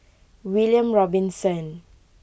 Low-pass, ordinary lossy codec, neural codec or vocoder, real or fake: none; none; none; real